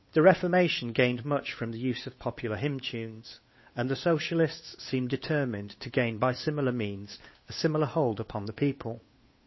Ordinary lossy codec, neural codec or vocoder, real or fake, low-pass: MP3, 24 kbps; codec, 16 kHz, 8 kbps, FunCodec, trained on Chinese and English, 25 frames a second; fake; 7.2 kHz